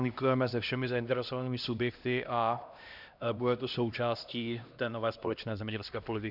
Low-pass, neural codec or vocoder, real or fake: 5.4 kHz; codec, 16 kHz, 1 kbps, X-Codec, HuBERT features, trained on LibriSpeech; fake